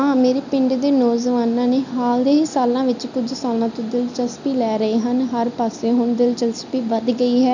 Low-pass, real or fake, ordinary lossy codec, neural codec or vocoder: 7.2 kHz; real; none; none